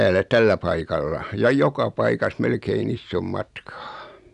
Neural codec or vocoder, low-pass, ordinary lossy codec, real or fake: none; 10.8 kHz; none; real